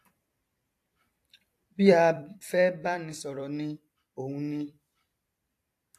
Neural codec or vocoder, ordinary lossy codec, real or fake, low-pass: vocoder, 44.1 kHz, 128 mel bands every 256 samples, BigVGAN v2; AAC, 64 kbps; fake; 14.4 kHz